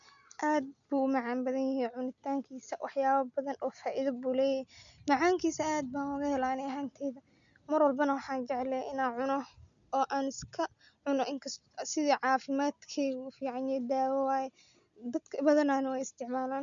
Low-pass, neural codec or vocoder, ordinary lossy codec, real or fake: 7.2 kHz; none; MP3, 96 kbps; real